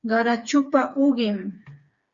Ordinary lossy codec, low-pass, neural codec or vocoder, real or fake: Opus, 64 kbps; 7.2 kHz; codec, 16 kHz, 4 kbps, FreqCodec, smaller model; fake